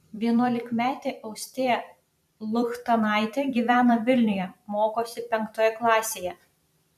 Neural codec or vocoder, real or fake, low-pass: vocoder, 44.1 kHz, 128 mel bands every 256 samples, BigVGAN v2; fake; 14.4 kHz